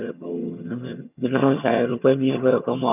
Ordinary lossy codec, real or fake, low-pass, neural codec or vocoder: none; fake; 3.6 kHz; vocoder, 22.05 kHz, 80 mel bands, HiFi-GAN